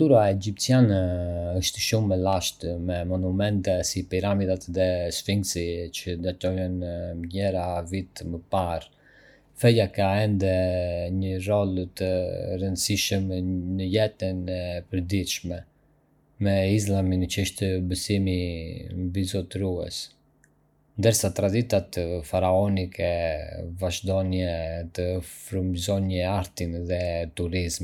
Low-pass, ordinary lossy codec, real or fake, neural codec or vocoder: 19.8 kHz; none; real; none